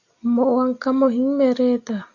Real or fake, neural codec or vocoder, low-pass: real; none; 7.2 kHz